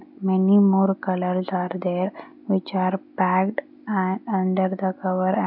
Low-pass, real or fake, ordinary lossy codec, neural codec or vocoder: 5.4 kHz; real; none; none